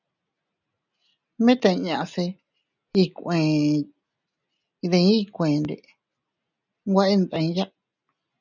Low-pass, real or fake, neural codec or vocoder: 7.2 kHz; real; none